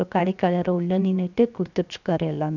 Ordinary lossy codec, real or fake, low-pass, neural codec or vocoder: none; fake; 7.2 kHz; codec, 16 kHz, 0.7 kbps, FocalCodec